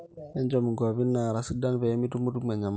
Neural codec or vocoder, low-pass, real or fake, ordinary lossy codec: none; none; real; none